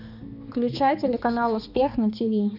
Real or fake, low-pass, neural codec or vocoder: fake; 5.4 kHz; codec, 16 kHz, 2 kbps, X-Codec, HuBERT features, trained on balanced general audio